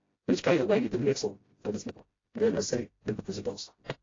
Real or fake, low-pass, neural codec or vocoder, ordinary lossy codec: fake; 7.2 kHz; codec, 16 kHz, 0.5 kbps, FreqCodec, smaller model; AAC, 32 kbps